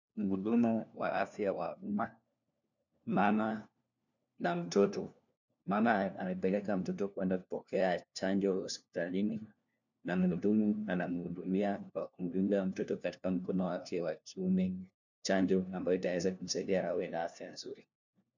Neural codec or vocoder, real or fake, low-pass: codec, 16 kHz, 1 kbps, FunCodec, trained on LibriTTS, 50 frames a second; fake; 7.2 kHz